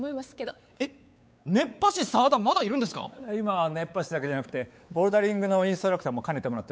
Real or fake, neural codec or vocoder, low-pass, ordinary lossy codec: fake; codec, 16 kHz, 4 kbps, X-Codec, WavLM features, trained on Multilingual LibriSpeech; none; none